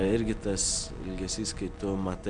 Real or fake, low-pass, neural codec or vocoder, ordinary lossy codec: real; 9.9 kHz; none; MP3, 96 kbps